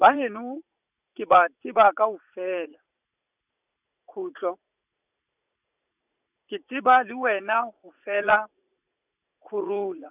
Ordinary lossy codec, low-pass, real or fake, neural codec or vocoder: none; 3.6 kHz; fake; vocoder, 44.1 kHz, 80 mel bands, Vocos